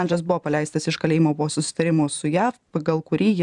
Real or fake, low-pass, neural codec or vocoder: fake; 10.8 kHz; vocoder, 44.1 kHz, 128 mel bands every 512 samples, BigVGAN v2